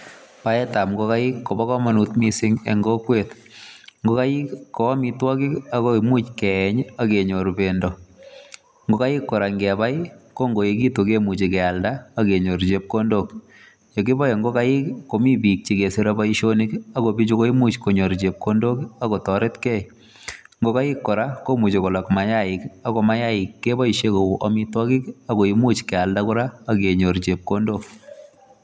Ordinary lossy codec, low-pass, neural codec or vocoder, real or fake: none; none; none; real